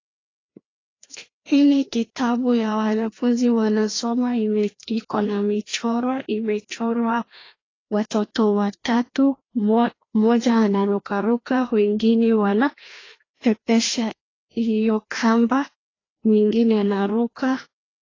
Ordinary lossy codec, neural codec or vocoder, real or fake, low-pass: AAC, 32 kbps; codec, 16 kHz, 1 kbps, FreqCodec, larger model; fake; 7.2 kHz